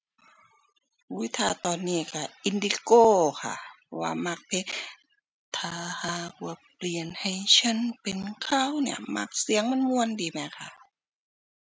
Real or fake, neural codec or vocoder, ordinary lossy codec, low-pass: real; none; none; none